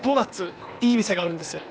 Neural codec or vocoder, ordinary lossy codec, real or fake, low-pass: codec, 16 kHz, 0.8 kbps, ZipCodec; none; fake; none